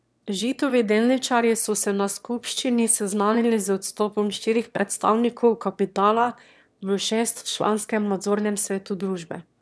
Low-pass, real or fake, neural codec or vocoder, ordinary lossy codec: none; fake; autoencoder, 22.05 kHz, a latent of 192 numbers a frame, VITS, trained on one speaker; none